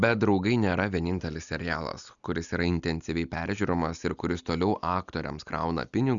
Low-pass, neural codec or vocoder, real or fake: 7.2 kHz; none; real